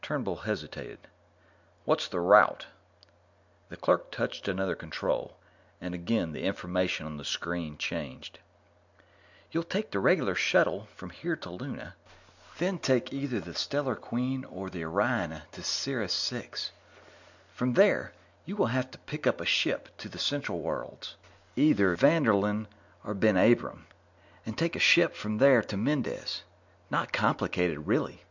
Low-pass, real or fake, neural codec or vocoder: 7.2 kHz; real; none